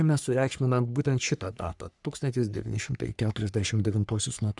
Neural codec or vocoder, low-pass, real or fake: codec, 44.1 kHz, 3.4 kbps, Pupu-Codec; 10.8 kHz; fake